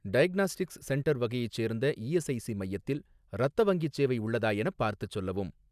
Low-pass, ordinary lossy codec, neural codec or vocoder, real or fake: 14.4 kHz; none; none; real